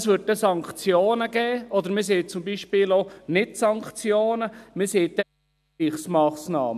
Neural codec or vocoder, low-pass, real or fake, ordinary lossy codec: none; 14.4 kHz; real; none